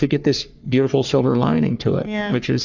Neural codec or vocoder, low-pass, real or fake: codec, 44.1 kHz, 3.4 kbps, Pupu-Codec; 7.2 kHz; fake